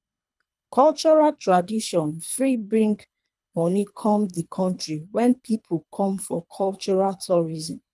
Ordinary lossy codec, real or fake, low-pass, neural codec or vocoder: none; fake; none; codec, 24 kHz, 3 kbps, HILCodec